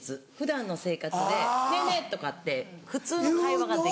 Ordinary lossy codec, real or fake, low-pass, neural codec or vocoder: none; real; none; none